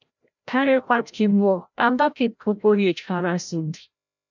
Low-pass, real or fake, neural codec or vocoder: 7.2 kHz; fake; codec, 16 kHz, 0.5 kbps, FreqCodec, larger model